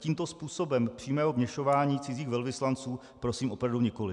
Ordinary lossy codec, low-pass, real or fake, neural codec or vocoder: MP3, 96 kbps; 10.8 kHz; real; none